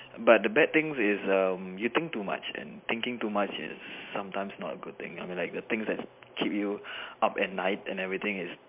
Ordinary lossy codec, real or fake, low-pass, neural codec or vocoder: MP3, 32 kbps; real; 3.6 kHz; none